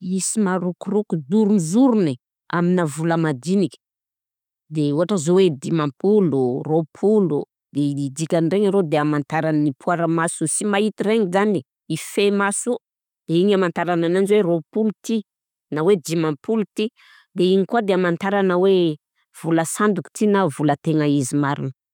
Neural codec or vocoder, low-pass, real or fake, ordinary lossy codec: none; 19.8 kHz; real; none